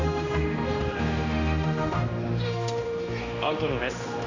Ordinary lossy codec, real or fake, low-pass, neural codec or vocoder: AAC, 48 kbps; fake; 7.2 kHz; codec, 16 kHz, 1 kbps, X-Codec, HuBERT features, trained on general audio